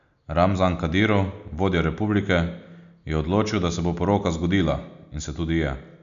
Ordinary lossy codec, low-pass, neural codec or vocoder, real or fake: none; 7.2 kHz; none; real